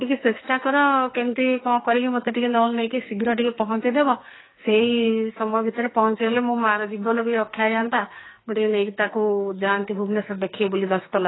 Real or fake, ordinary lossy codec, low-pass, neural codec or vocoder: fake; AAC, 16 kbps; 7.2 kHz; codec, 44.1 kHz, 2.6 kbps, SNAC